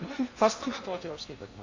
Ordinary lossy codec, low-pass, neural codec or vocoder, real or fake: AAC, 48 kbps; 7.2 kHz; codec, 16 kHz in and 24 kHz out, 0.8 kbps, FocalCodec, streaming, 65536 codes; fake